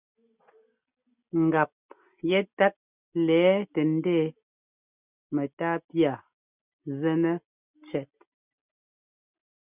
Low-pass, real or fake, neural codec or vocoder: 3.6 kHz; real; none